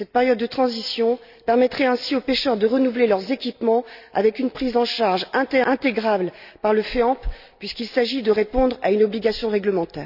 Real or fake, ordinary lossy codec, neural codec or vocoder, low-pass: real; none; none; 5.4 kHz